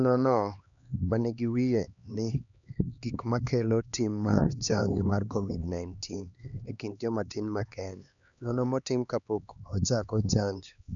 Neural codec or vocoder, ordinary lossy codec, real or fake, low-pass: codec, 16 kHz, 2 kbps, X-Codec, HuBERT features, trained on LibriSpeech; none; fake; 7.2 kHz